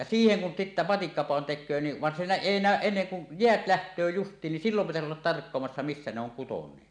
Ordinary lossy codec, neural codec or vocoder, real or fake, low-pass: none; none; real; 9.9 kHz